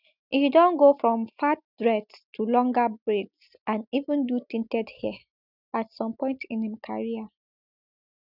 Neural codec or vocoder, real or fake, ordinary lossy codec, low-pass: none; real; none; 5.4 kHz